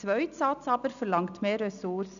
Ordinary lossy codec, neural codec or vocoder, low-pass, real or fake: none; none; 7.2 kHz; real